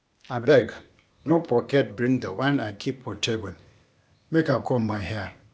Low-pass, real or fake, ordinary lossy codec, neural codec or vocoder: none; fake; none; codec, 16 kHz, 0.8 kbps, ZipCodec